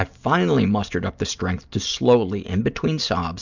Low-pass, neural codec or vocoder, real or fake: 7.2 kHz; none; real